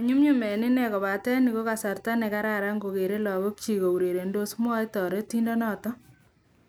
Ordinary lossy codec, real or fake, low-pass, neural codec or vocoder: none; real; none; none